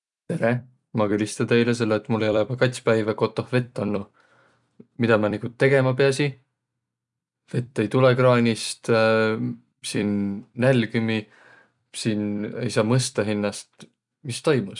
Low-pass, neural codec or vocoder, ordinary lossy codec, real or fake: 10.8 kHz; vocoder, 44.1 kHz, 128 mel bands every 256 samples, BigVGAN v2; none; fake